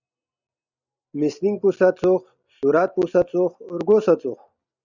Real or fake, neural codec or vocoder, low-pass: real; none; 7.2 kHz